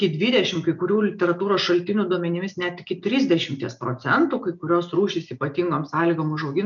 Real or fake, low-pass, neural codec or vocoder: real; 7.2 kHz; none